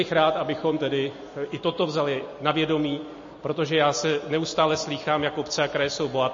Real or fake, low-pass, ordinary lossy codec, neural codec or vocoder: real; 7.2 kHz; MP3, 32 kbps; none